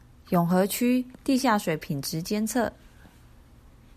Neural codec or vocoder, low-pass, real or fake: none; 14.4 kHz; real